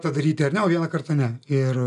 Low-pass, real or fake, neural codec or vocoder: 10.8 kHz; real; none